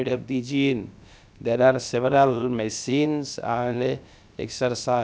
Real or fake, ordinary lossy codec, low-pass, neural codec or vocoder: fake; none; none; codec, 16 kHz, about 1 kbps, DyCAST, with the encoder's durations